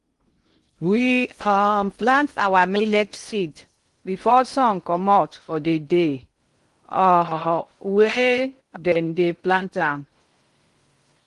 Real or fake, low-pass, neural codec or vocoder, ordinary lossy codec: fake; 10.8 kHz; codec, 16 kHz in and 24 kHz out, 0.6 kbps, FocalCodec, streaming, 2048 codes; Opus, 24 kbps